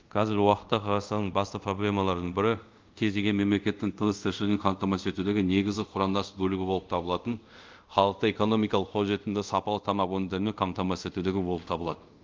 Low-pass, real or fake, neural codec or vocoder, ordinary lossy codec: 7.2 kHz; fake; codec, 24 kHz, 0.5 kbps, DualCodec; Opus, 24 kbps